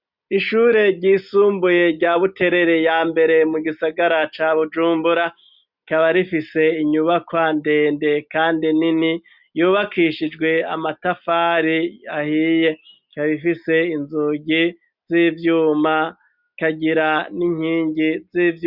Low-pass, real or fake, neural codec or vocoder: 5.4 kHz; real; none